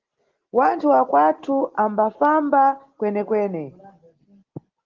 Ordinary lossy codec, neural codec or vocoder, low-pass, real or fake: Opus, 16 kbps; none; 7.2 kHz; real